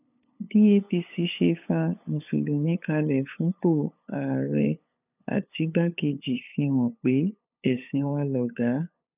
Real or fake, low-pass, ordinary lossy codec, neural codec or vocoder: fake; 3.6 kHz; none; codec, 16 kHz, 8 kbps, FunCodec, trained on LibriTTS, 25 frames a second